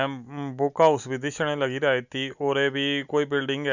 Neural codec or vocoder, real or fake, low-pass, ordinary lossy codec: none; real; 7.2 kHz; none